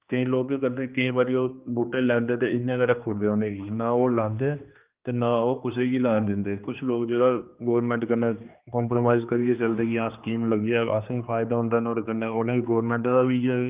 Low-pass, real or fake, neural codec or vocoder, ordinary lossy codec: 3.6 kHz; fake; codec, 16 kHz, 2 kbps, X-Codec, HuBERT features, trained on general audio; Opus, 24 kbps